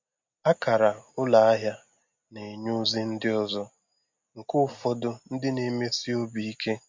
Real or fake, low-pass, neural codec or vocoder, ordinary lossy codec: real; 7.2 kHz; none; MP3, 48 kbps